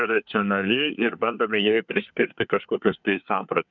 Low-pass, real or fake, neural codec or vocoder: 7.2 kHz; fake; codec, 24 kHz, 1 kbps, SNAC